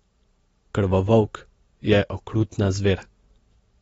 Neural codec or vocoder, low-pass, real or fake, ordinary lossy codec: none; 19.8 kHz; real; AAC, 24 kbps